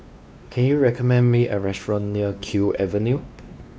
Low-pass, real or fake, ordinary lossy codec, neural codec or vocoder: none; fake; none; codec, 16 kHz, 2 kbps, X-Codec, WavLM features, trained on Multilingual LibriSpeech